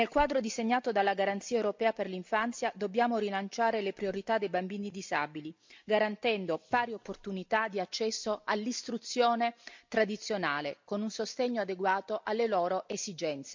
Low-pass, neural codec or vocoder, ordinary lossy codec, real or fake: 7.2 kHz; none; MP3, 64 kbps; real